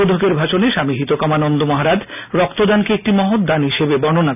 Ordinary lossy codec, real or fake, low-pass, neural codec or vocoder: none; real; 3.6 kHz; none